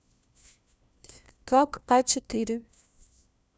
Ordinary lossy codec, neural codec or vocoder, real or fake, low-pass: none; codec, 16 kHz, 1 kbps, FunCodec, trained on LibriTTS, 50 frames a second; fake; none